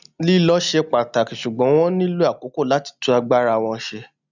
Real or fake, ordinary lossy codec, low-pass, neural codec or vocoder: real; none; 7.2 kHz; none